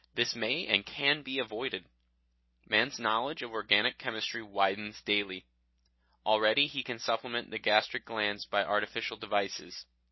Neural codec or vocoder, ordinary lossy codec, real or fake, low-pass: none; MP3, 24 kbps; real; 7.2 kHz